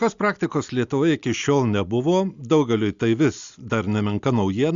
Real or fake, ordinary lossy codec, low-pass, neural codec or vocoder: real; Opus, 64 kbps; 7.2 kHz; none